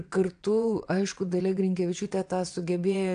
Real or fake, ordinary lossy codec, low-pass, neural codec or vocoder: fake; AAC, 64 kbps; 9.9 kHz; vocoder, 22.05 kHz, 80 mel bands, WaveNeXt